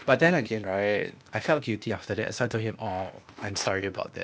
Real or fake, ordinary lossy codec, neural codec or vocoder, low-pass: fake; none; codec, 16 kHz, 0.8 kbps, ZipCodec; none